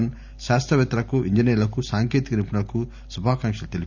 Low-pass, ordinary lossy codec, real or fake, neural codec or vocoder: 7.2 kHz; none; real; none